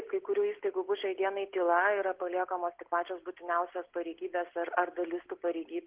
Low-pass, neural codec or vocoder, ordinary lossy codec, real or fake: 3.6 kHz; none; Opus, 16 kbps; real